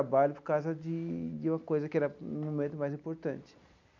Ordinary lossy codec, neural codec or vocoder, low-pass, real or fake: none; none; 7.2 kHz; real